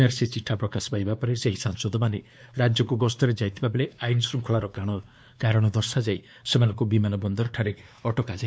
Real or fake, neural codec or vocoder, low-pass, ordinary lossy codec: fake; codec, 16 kHz, 2 kbps, X-Codec, WavLM features, trained on Multilingual LibriSpeech; none; none